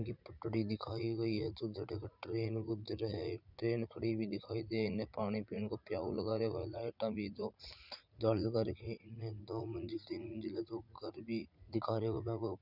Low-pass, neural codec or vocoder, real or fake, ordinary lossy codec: 5.4 kHz; vocoder, 44.1 kHz, 80 mel bands, Vocos; fake; none